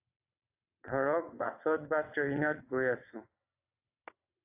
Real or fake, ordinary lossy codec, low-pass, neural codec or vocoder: fake; AAC, 32 kbps; 3.6 kHz; vocoder, 22.05 kHz, 80 mel bands, WaveNeXt